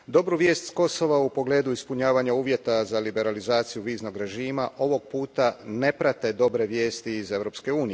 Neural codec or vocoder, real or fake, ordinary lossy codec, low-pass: none; real; none; none